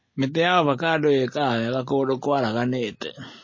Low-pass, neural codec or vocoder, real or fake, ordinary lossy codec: 7.2 kHz; none; real; MP3, 32 kbps